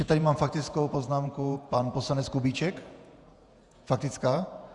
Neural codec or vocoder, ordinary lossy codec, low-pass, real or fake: vocoder, 48 kHz, 128 mel bands, Vocos; Opus, 64 kbps; 10.8 kHz; fake